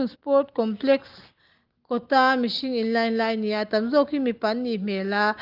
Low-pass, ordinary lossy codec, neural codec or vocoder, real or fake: 5.4 kHz; Opus, 32 kbps; none; real